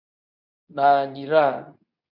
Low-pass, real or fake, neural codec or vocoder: 5.4 kHz; fake; codec, 24 kHz, 0.9 kbps, WavTokenizer, medium speech release version 2